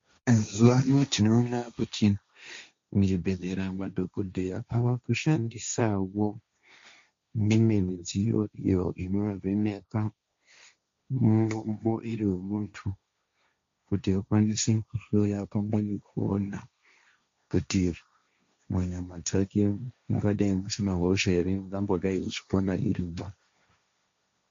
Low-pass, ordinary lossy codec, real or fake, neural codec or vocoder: 7.2 kHz; MP3, 48 kbps; fake; codec, 16 kHz, 1.1 kbps, Voila-Tokenizer